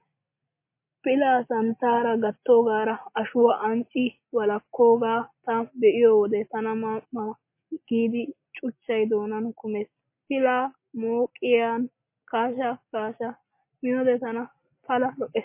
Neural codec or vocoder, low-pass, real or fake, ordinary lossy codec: none; 3.6 kHz; real; MP3, 24 kbps